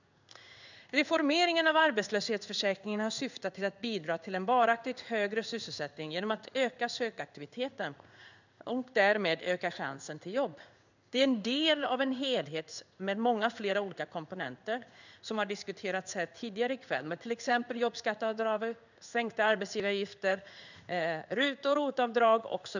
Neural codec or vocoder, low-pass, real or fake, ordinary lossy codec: codec, 16 kHz in and 24 kHz out, 1 kbps, XY-Tokenizer; 7.2 kHz; fake; none